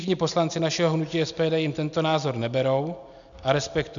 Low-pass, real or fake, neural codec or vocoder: 7.2 kHz; real; none